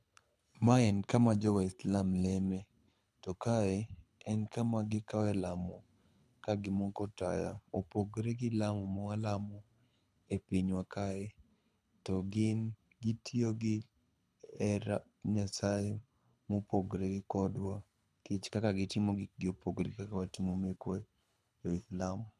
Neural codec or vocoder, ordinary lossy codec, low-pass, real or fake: codec, 24 kHz, 6 kbps, HILCodec; none; none; fake